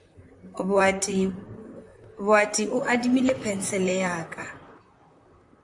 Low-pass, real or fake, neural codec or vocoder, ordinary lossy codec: 10.8 kHz; fake; vocoder, 44.1 kHz, 128 mel bands, Pupu-Vocoder; AAC, 64 kbps